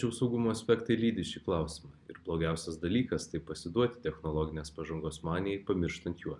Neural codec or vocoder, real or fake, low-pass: none; real; 10.8 kHz